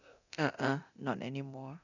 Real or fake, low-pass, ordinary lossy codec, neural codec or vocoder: fake; 7.2 kHz; none; codec, 24 kHz, 0.9 kbps, DualCodec